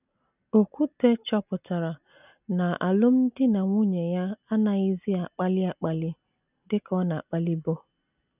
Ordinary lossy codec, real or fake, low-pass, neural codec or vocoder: none; real; 3.6 kHz; none